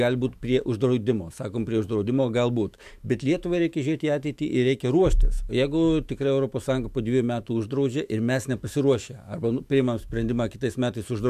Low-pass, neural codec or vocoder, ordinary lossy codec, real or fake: 14.4 kHz; autoencoder, 48 kHz, 128 numbers a frame, DAC-VAE, trained on Japanese speech; AAC, 96 kbps; fake